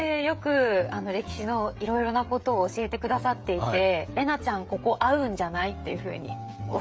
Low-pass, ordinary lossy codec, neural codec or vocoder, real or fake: none; none; codec, 16 kHz, 16 kbps, FreqCodec, smaller model; fake